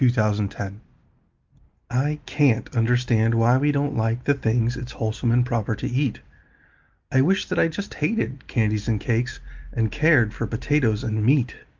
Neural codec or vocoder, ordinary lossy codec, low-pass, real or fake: none; Opus, 32 kbps; 7.2 kHz; real